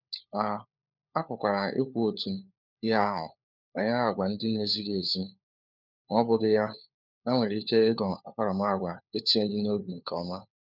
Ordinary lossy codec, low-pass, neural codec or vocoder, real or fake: none; 5.4 kHz; codec, 16 kHz, 4 kbps, FunCodec, trained on LibriTTS, 50 frames a second; fake